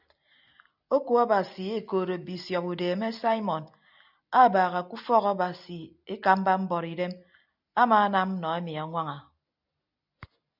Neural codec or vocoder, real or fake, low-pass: none; real; 5.4 kHz